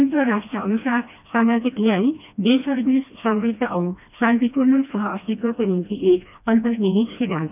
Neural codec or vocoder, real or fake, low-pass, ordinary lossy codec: codec, 16 kHz, 1 kbps, FreqCodec, smaller model; fake; 3.6 kHz; none